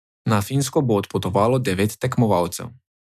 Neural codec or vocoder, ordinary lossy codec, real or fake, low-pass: none; none; real; 14.4 kHz